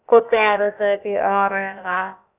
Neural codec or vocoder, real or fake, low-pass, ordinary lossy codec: codec, 16 kHz, about 1 kbps, DyCAST, with the encoder's durations; fake; 3.6 kHz; none